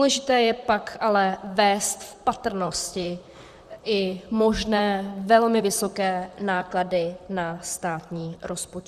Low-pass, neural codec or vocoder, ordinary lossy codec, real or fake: 14.4 kHz; vocoder, 44.1 kHz, 128 mel bands, Pupu-Vocoder; Opus, 64 kbps; fake